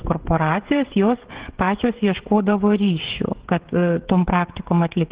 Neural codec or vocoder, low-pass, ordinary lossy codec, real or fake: codec, 16 kHz, 16 kbps, FreqCodec, smaller model; 3.6 kHz; Opus, 16 kbps; fake